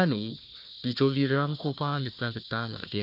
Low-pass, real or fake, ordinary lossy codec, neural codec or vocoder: 5.4 kHz; fake; none; codec, 16 kHz, 1 kbps, FunCodec, trained on Chinese and English, 50 frames a second